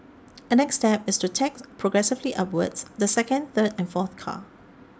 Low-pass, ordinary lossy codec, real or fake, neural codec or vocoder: none; none; real; none